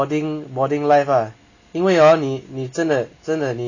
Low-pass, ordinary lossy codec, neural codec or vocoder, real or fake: 7.2 kHz; none; none; real